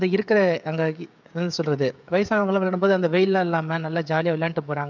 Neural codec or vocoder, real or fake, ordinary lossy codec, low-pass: codec, 16 kHz, 16 kbps, FreqCodec, smaller model; fake; none; 7.2 kHz